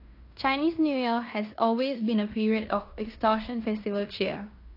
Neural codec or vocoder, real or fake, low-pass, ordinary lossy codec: codec, 16 kHz in and 24 kHz out, 0.9 kbps, LongCat-Audio-Codec, fine tuned four codebook decoder; fake; 5.4 kHz; AAC, 32 kbps